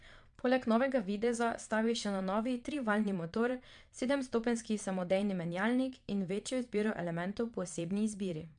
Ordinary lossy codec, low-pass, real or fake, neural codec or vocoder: MP3, 64 kbps; 9.9 kHz; fake; vocoder, 22.05 kHz, 80 mel bands, Vocos